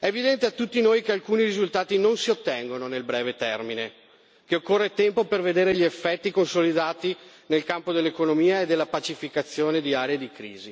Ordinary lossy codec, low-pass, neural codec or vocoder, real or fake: none; none; none; real